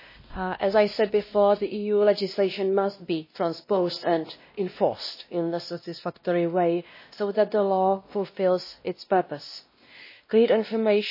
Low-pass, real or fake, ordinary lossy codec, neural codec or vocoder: 5.4 kHz; fake; MP3, 24 kbps; codec, 16 kHz, 1 kbps, X-Codec, WavLM features, trained on Multilingual LibriSpeech